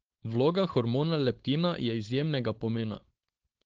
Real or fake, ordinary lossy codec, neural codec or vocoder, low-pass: fake; Opus, 16 kbps; codec, 16 kHz, 4.8 kbps, FACodec; 7.2 kHz